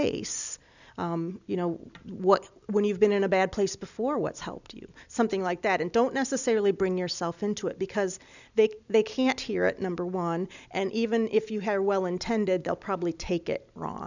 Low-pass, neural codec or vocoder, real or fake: 7.2 kHz; none; real